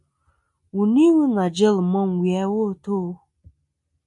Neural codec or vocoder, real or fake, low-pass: none; real; 10.8 kHz